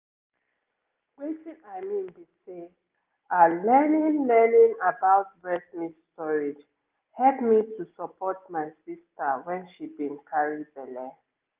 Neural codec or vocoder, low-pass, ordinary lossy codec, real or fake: none; 3.6 kHz; Opus, 24 kbps; real